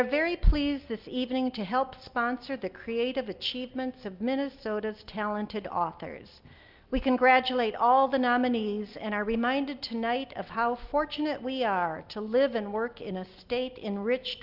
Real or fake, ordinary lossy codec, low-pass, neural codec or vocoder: real; Opus, 24 kbps; 5.4 kHz; none